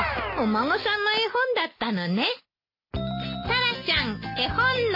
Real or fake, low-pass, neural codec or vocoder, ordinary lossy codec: real; 5.4 kHz; none; MP3, 24 kbps